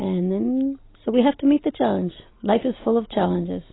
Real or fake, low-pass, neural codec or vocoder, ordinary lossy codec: real; 7.2 kHz; none; AAC, 16 kbps